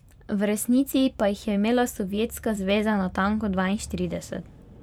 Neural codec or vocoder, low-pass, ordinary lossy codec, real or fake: vocoder, 44.1 kHz, 128 mel bands every 512 samples, BigVGAN v2; 19.8 kHz; none; fake